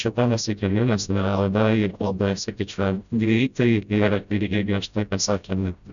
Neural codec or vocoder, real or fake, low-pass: codec, 16 kHz, 0.5 kbps, FreqCodec, smaller model; fake; 7.2 kHz